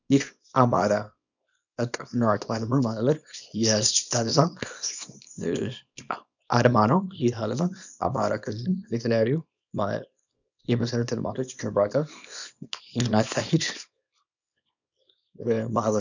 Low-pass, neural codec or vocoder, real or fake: 7.2 kHz; codec, 24 kHz, 0.9 kbps, WavTokenizer, small release; fake